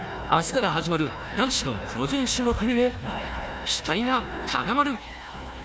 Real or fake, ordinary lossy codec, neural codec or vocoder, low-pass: fake; none; codec, 16 kHz, 1 kbps, FunCodec, trained on Chinese and English, 50 frames a second; none